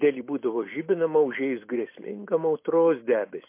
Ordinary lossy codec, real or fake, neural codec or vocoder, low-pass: MP3, 24 kbps; fake; vocoder, 44.1 kHz, 128 mel bands every 256 samples, BigVGAN v2; 3.6 kHz